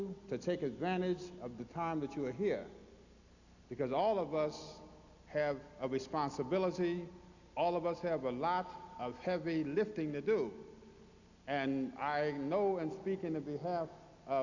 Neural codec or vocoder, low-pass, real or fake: none; 7.2 kHz; real